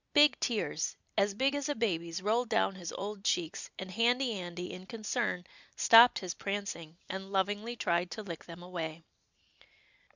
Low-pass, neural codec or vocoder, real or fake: 7.2 kHz; none; real